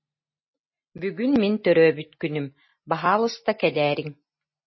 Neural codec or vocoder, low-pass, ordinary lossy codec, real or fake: none; 7.2 kHz; MP3, 24 kbps; real